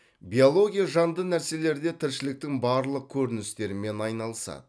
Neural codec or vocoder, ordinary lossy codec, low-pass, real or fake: none; none; none; real